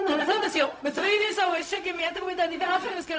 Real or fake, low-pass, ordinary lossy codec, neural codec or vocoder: fake; none; none; codec, 16 kHz, 0.4 kbps, LongCat-Audio-Codec